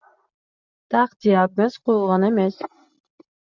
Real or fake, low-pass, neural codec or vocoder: real; 7.2 kHz; none